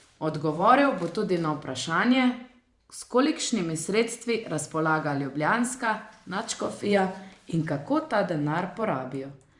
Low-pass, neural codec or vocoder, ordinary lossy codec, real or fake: 10.8 kHz; vocoder, 44.1 kHz, 128 mel bands every 512 samples, BigVGAN v2; Opus, 64 kbps; fake